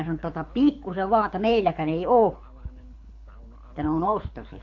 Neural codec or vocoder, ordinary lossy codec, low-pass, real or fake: codec, 24 kHz, 6 kbps, HILCodec; AAC, 48 kbps; 7.2 kHz; fake